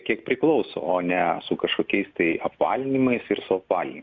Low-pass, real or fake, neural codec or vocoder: 7.2 kHz; real; none